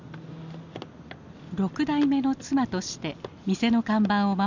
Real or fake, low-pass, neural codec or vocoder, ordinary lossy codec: real; 7.2 kHz; none; none